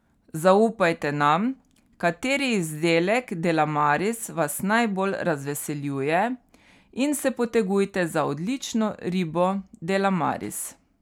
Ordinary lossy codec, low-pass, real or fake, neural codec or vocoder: none; 19.8 kHz; real; none